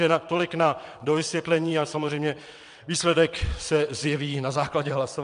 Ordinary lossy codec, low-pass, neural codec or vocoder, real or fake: MP3, 64 kbps; 9.9 kHz; vocoder, 22.05 kHz, 80 mel bands, WaveNeXt; fake